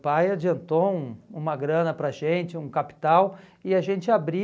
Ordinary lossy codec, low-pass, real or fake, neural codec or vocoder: none; none; real; none